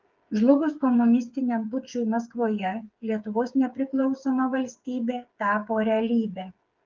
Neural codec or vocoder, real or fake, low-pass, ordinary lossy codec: codec, 16 kHz, 8 kbps, FreqCodec, smaller model; fake; 7.2 kHz; Opus, 32 kbps